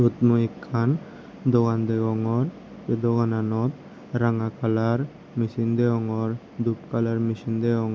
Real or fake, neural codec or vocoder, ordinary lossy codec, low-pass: real; none; none; none